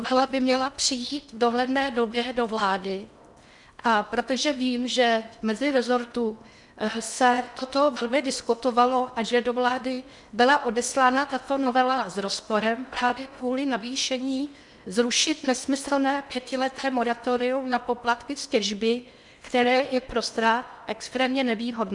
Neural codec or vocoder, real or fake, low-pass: codec, 16 kHz in and 24 kHz out, 0.8 kbps, FocalCodec, streaming, 65536 codes; fake; 10.8 kHz